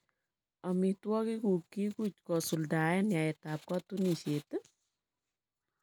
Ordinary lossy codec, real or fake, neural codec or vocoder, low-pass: none; real; none; none